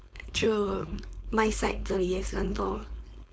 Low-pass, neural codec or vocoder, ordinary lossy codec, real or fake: none; codec, 16 kHz, 4.8 kbps, FACodec; none; fake